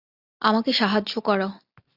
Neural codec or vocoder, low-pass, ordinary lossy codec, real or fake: none; 5.4 kHz; AAC, 48 kbps; real